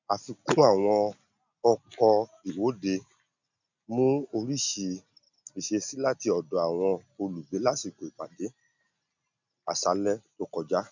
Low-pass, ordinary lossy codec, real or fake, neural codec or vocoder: 7.2 kHz; none; real; none